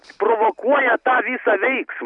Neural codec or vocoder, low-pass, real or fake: none; 10.8 kHz; real